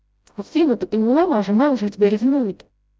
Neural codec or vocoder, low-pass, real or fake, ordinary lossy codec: codec, 16 kHz, 0.5 kbps, FreqCodec, smaller model; none; fake; none